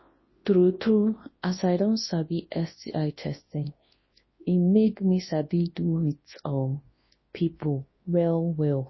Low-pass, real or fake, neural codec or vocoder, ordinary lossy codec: 7.2 kHz; fake; codec, 24 kHz, 0.9 kbps, WavTokenizer, large speech release; MP3, 24 kbps